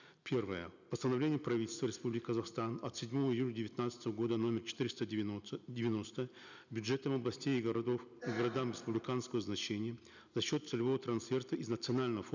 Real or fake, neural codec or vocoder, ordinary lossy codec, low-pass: real; none; none; 7.2 kHz